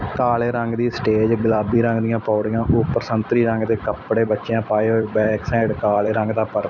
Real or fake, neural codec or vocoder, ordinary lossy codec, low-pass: fake; vocoder, 44.1 kHz, 128 mel bands every 256 samples, BigVGAN v2; none; 7.2 kHz